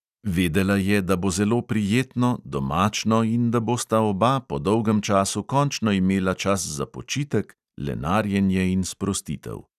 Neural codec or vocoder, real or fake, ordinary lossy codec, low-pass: none; real; none; 14.4 kHz